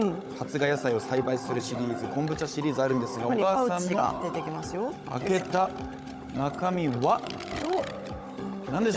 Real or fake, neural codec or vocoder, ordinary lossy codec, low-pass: fake; codec, 16 kHz, 16 kbps, FunCodec, trained on Chinese and English, 50 frames a second; none; none